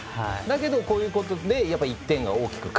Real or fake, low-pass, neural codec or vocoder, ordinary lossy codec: real; none; none; none